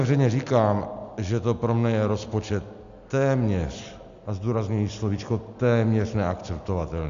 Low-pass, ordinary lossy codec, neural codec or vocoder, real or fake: 7.2 kHz; MP3, 64 kbps; none; real